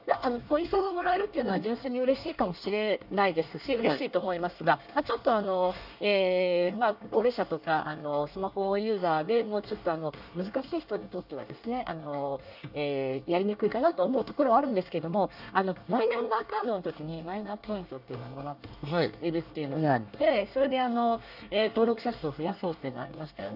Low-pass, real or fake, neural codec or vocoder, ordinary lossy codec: 5.4 kHz; fake; codec, 24 kHz, 1 kbps, SNAC; none